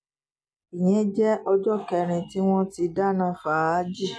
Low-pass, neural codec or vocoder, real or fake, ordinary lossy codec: none; none; real; none